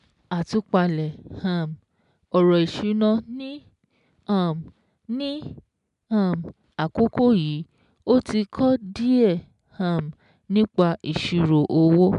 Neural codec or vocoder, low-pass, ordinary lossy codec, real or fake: none; 10.8 kHz; MP3, 96 kbps; real